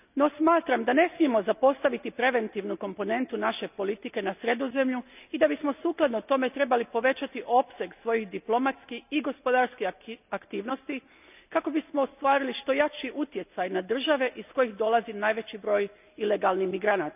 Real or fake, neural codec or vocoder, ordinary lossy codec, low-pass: real; none; none; 3.6 kHz